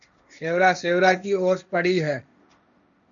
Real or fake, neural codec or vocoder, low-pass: fake; codec, 16 kHz, 1.1 kbps, Voila-Tokenizer; 7.2 kHz